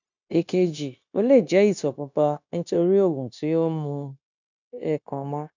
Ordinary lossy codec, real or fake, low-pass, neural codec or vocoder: none; fake; 7.2 kHz; codec, 16 kHz, 0.9 kbps, LongCat-Audio-Codec